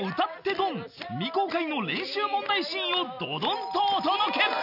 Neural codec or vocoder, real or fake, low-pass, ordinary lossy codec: none; real; 5.4 kHz; none